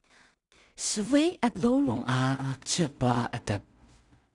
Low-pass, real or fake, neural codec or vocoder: 10.8 kHz; fake; codec, 16 kHz in and 24 kHz out, 0.4 kbps, LongCat-Audio-Codec, two codebook decoder